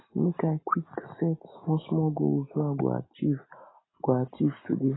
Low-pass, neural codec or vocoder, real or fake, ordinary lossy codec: 7.2 kHz; none; real; AAC, 16 kbps